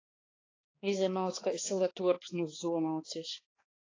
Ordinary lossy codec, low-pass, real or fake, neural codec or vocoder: AAC, 32 kbps; 7.2 kHz; fake; codec, 16 kHz, 2 kbps, X-Codec, HuBERT features, trained on balanced general audio